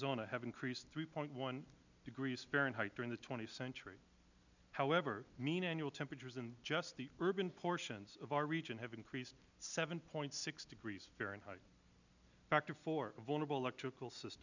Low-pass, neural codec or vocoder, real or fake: 7.2 kHz; none; real